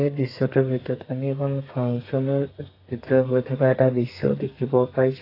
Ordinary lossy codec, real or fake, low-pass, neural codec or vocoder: AAC, 32 kbps; fake; 5.4 kHz; codec, 32 kHz, 1.9 kbps, SNAC